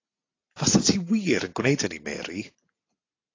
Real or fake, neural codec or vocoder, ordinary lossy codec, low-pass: real; none; AAC, 32 kbps; 7.2 kHz